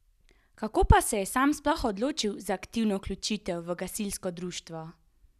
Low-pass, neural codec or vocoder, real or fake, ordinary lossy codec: 14.4 kHz; none; real; none